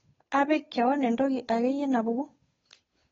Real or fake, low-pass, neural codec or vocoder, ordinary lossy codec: fake; 7.2 kHz; codec, 16 kHz, 8 kbps, FunCodec, trained on Chinese and English, 25 frames a second; AAC, 24 kbps